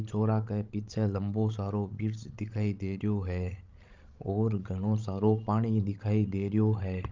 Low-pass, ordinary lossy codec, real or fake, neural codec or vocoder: 7.2 kHz; Opus, 32 kbps; fake; codec, 16 kHz, 16 kbps, FreqCodec, larger model